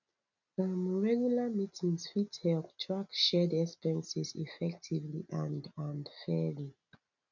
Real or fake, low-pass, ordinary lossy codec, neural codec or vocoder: real; 7.2 kHz; none; none